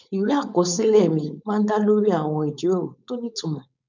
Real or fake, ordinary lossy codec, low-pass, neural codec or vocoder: fake; none; 7.2 kHz; codec, 16 kHz, 4.8 kbps, FACodec